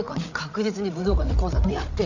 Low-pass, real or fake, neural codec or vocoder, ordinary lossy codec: 7.2 kHz; fake; codec, 16 kHz, 8 kbps, FreqCodec, larger model; none